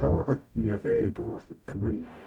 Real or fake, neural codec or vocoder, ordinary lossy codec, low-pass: fake; codec, 44.1 kHz, 0.9 kbps, DAC; none; 19.8 kHz